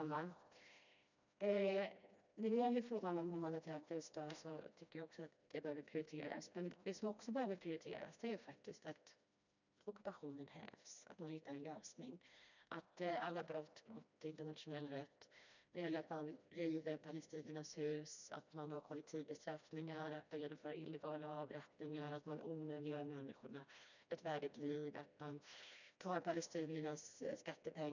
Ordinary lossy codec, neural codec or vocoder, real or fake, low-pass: none; codec, 16 kHz, 1 kbps, FreqCodec, smaller model; fake; 7.2 kHz